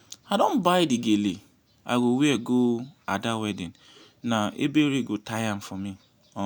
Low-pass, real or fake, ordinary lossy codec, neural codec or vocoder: none; real; none; none